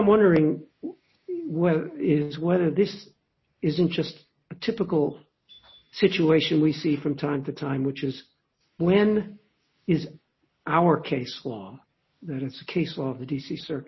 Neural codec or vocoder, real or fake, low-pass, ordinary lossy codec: none; real; 7.2 kHz; MP3, 24 kbps